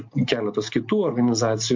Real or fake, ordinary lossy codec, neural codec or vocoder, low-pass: real; MP3, 48 kbps; none; 7.2 kHz